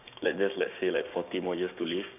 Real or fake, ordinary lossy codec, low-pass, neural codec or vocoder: fake; none; 3.6 kHz; codec, 16 kHz, 6 kbps, DAC